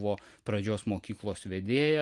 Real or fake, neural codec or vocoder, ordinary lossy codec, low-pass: real; none; Opus, 24 kbps; 10.8 kHz